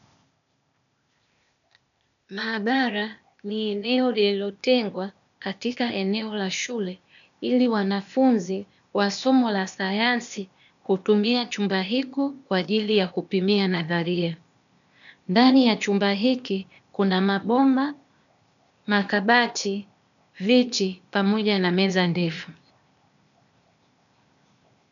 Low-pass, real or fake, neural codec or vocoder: 7.2 kHz; fake; codec, 16 kHz, 0.8 kbps, ZipCodec